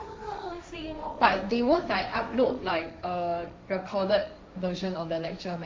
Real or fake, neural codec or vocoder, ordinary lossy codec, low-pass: fake; codec, 16 kHz, 1.1 kbps, Voila-Tokenizer; none; none